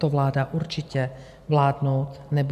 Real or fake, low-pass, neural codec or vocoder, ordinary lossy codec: real; 14.4 kHz; none; MP3, 96 kbps